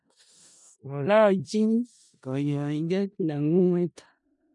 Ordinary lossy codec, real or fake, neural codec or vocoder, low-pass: none; fake; codec, 16 kHz in and 24 kHz out, 0.4 kbps, LongCat-Audio-Codec, four codebook decoder; 10.8 kHz